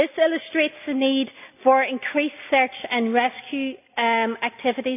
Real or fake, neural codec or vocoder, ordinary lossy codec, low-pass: real; none; none; 3.6 kHz